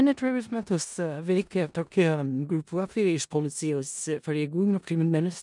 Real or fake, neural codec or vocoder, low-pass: fake; codec, 16 kHz in and 24 kHz out, 0.4 kbps, LongCat-Audio-Codec, four codebook decoder; 10.8 kHz